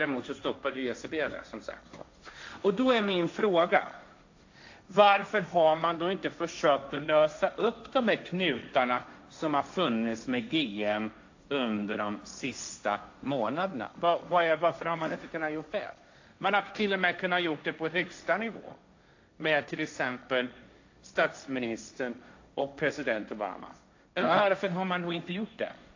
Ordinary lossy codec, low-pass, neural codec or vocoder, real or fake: AAC, 48 kbps; 7.2 kHz; codec, 16 kHz, 1.1 kbps, Voila-Tokenizer; fake